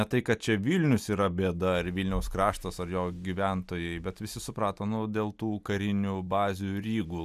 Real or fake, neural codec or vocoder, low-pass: real; none; 14.4 kHz